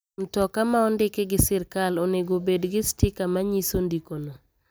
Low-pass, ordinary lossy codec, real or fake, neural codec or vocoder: none; none; real; none